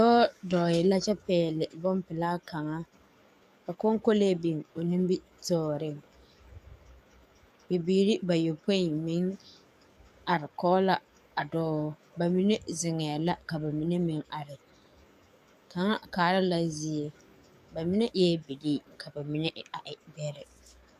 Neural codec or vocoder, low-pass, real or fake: codec, 44.1 kHz, 7.8 kbps, DAC; 14.4 kHz; fake